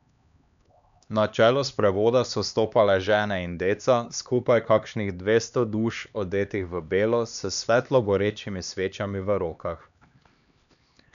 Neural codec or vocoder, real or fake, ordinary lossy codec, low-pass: codec, 16 kHz, 2 kbps, X-Codec, HuBERT features, trained on LibriSpeech; fake; none; 7.2 kHz